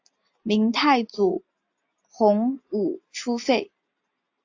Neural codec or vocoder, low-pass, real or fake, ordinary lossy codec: none; 7.2 kHz; real; AAC, 48 kbps